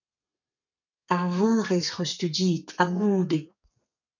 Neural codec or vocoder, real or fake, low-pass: codec, 32 kHz, 1.9 kbps, SNAC; fake; 7.2 kHz